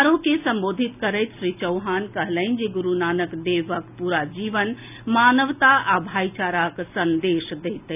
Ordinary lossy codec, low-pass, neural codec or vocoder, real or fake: none; 3.6 kHz; none; real